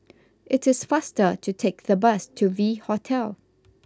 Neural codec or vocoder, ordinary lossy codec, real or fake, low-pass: none; none; real; none